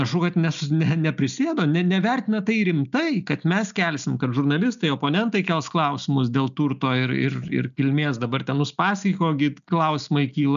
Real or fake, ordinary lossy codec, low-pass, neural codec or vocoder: real; MP3, 96 kbps; 7.2 kHz; none